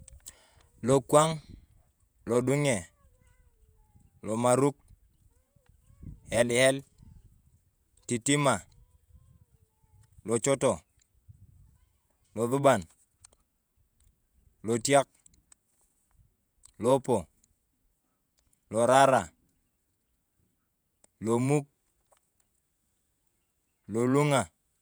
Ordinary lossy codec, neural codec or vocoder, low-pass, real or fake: none; vocoder, 48 kHz, 128 mel bands, Vocos; none; fake